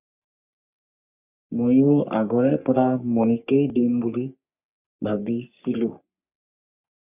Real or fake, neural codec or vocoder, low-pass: fake; codec, 44.1 kHz, 3.4 kbps, Pupu-Codec; 3.6 kHz